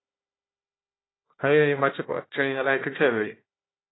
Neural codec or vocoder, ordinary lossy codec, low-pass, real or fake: codec, 16 kHz, 1 kbps, FunCodec, trained on Chinese and English, 50 frames a second; AAC, 16 kbps; 7.2 kHz; fake